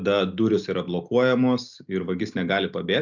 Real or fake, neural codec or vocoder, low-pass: real; none; 7.2 kHz